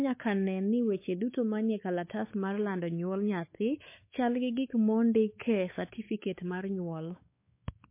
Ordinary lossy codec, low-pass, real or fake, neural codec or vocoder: MP3, 24 kbps; 3.6 kHz; fake; codec, 16 kHz, 4 kbps, X-Codec, WavLM features, trained on Multilingual LibriSpeech